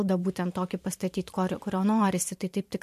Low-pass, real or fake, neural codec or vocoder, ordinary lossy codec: 14.4 kHz; fake; vocoder, 44.1 kHz, 128 mel bands every 512 samples, BigVGAN v2; MP3, 64 kbps